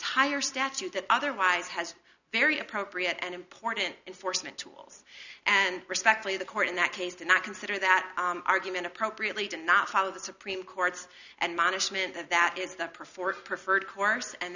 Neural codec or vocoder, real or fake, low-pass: none; real; 7.2 kHz